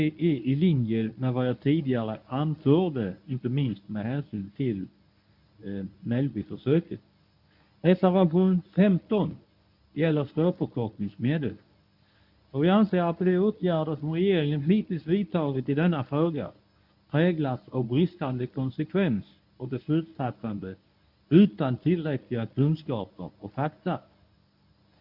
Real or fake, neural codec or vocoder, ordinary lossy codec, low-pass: fake; codec, 24 kHz, 0.9 kbps, WavTokenizer, medium speech release version 1; none; 5.4 kHz